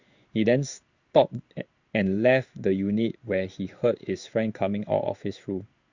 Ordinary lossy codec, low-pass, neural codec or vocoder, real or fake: Opus, 64 kbps; 7.2 kHz; codec, 16 kHz in and 24 kHz out, 1 kbps, XY-Tokenizer; fake